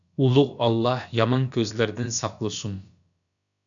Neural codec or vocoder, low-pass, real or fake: codec, 16 kHz, about 1 kbps, DyCAST, with the encoder's durations; 7.2 kHz; fake